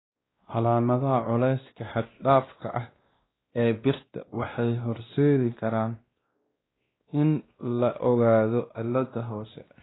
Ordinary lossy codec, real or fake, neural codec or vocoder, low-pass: AAC, 16 kbps; fake; codec, 16 kHz, 1 kbps, X-Codec, WavLM features, trained on Multilingual LibriSpeech; 7.2 kHz